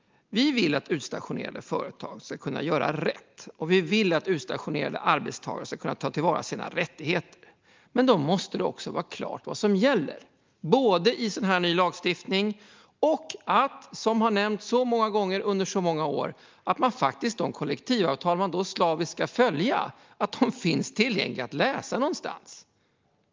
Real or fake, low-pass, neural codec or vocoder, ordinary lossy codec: real; 7.2 kHz; none; Opus, 24 kbps